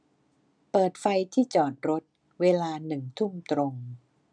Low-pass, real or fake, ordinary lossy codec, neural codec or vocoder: 9.9 kHz; real; none; none